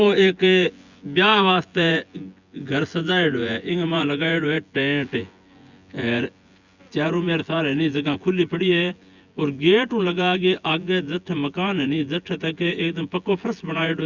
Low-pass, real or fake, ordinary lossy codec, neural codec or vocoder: 7.2 kHz; fake; Opus, 64 kbps; vocoder, 24 kHz, 100 mel bands, Vocos